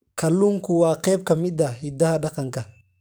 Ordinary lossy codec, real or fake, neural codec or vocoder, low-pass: none; fake; codec, 44.1 kHz, 7.8 kbps, DAC; none